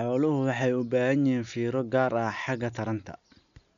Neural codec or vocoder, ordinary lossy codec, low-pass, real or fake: none; none; 7.2 kHz; real